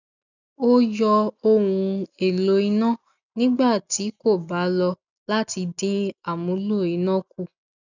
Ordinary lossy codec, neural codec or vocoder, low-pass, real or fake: none; none; 7.2 kHz; real